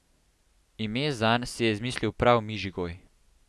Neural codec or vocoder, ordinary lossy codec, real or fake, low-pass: none; none; real; none